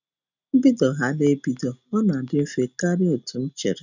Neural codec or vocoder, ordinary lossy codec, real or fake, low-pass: none; none; real; 7.2 kHz